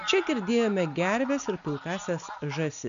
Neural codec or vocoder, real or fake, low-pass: none; real; 7.2 kHz